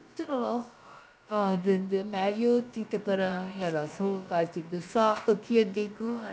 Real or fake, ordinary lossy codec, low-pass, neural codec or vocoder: fake; none; none; codec, 16 kHz, about 1 kbps, DyCAST, with the encoder's durations